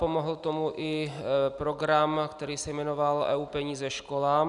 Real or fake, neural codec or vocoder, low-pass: real; none; 10.8 kHz